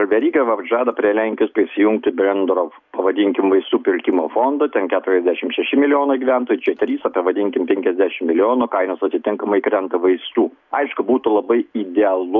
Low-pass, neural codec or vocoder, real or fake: 7.2 kHz; none; real